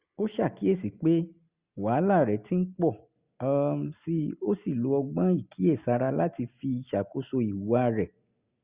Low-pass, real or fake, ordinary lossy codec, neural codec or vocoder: 3.6 kHz; real; Opus, 64 kbps; none